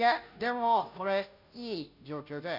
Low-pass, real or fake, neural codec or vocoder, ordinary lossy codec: 5.4 kHz; fake; codec, 16 kHz, 0.5 kbps, FunCodec, trained on Chinese and English, 25 frames a second; none